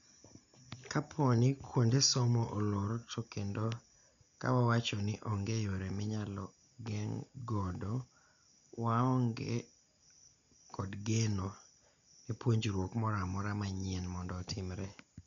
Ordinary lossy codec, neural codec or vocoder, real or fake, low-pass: none; none; real; 7.2 kHz